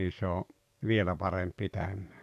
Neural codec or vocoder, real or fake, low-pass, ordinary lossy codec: codec, 44.1 kHz, 7.8 kbps, DAC; fake; 14.4 kHz; MP3, 96 kbps